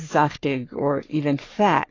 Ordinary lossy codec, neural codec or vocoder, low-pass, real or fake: AAC, 32 kbps; codec, 44.1 kHz, 3.4 kbps, Pupu-Codec; 7.2 kHz; fake